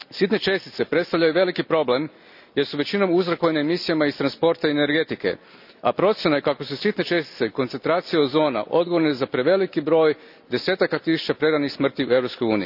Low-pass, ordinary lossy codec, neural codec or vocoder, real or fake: 5.4 kHz; none; none; real